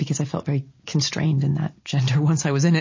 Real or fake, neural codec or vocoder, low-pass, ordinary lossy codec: real; none; 7.2 kHz; MP3, 32 kbps